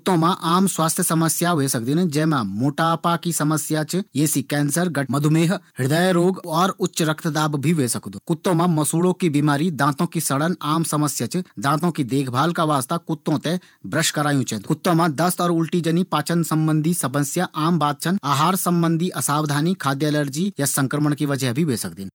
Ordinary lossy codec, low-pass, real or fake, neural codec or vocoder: none; none; fake; vocoder, 48 kHz, 128 mel bands, Vocos